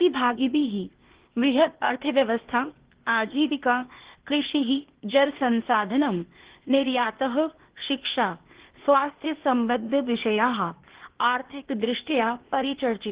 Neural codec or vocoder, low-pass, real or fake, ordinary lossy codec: codec, 16 kHz, 0.8 kbps, ZipCodec; 3.6 kHz; fake; Opus, 16 kbps